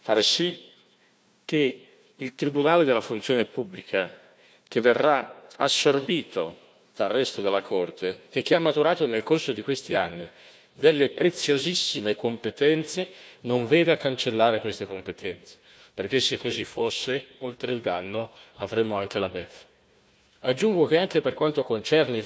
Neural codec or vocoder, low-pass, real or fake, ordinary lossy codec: codec, 16 kHz, 1 kbps, FunCodec, trained on Chinese and English, 50 frames a second; none; fake; none